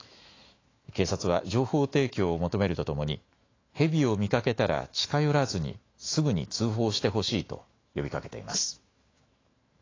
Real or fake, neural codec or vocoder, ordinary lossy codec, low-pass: fake; autoencoder, 48 kHz, 128 numbers a frame, DAC-VAE, trained on Japanese speech; AAC, 32 kbps; 7.2 kHz